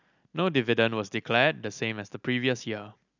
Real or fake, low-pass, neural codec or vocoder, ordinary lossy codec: real; 7.2 kHz; none; none